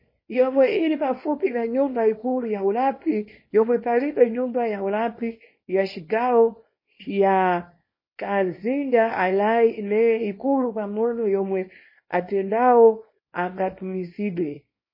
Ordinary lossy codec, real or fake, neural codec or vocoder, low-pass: MP3, 24 kbps; fake; codec, 24 kHz, 0.9 kbps, WavTokenizer, small release; 5.4 kHz